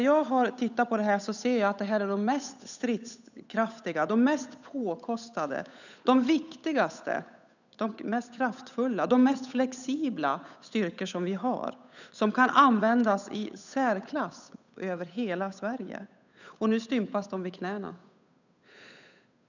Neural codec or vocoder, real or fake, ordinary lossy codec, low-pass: codec, 16 kHz, 8 kbps, FunCodec, trained on Chinese and English, 25 frames a second; fake; none; 7.2 kHz